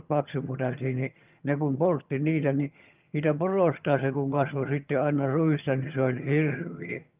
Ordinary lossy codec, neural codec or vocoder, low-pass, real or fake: Opus, 24 kbps; vocoder, 22.05 kHz, 80 mel bands, HiFi-GAN; 3.6 kHz; fake